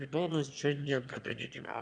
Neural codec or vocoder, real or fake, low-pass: autoencoder, 22.05 kHz, a latent of 192 numbers a frame, VITS, trained on one speaker; fake; 9.9 kHz